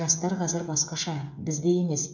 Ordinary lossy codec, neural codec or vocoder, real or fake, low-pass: none; codec, 16 kHz, 8 kbps, FreqCodec, smaller model; fake; 7.2 kHz